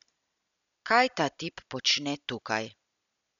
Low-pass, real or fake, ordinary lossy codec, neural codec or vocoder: 7.2 kHz; real; none; none